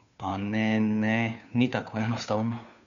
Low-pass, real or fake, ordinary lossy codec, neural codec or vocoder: 7.2 kHz; fake; none; codec, 16 kHz, 2 kbps, FunCodec, trained on Chinese and English, 25 frames a second